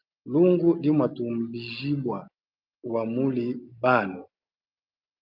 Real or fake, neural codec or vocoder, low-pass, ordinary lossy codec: real; none; 5.4 kHz; Opus, 24 kbps